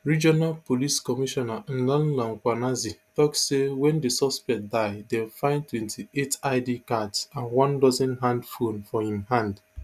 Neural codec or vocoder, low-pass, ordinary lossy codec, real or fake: none; 14.4 kHz; none; real